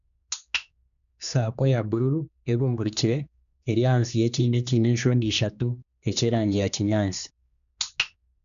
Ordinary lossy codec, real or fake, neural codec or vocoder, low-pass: none; fake; codec, 16 kHz, 2 kbps, X-Codec, HuBERT features, trained on general audio; 7.2 kHz